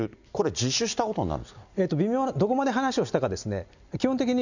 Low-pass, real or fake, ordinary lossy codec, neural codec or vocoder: 7.2 kHz; real; none; none